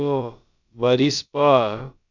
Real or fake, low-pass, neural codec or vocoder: fake; 7.2 kHz; codec, 16 kHz, about 1 kbps, DyCAST, with the encoder's durations